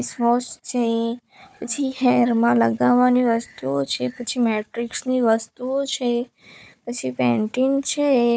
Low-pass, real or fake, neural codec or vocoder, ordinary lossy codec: none; fake; codec, 16 kHz, 4 kbps, FunCodec, trained on Chinese and English, 50 frames a second; none